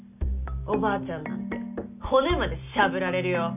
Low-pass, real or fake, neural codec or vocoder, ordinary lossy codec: 3.6 kHz; real; none; none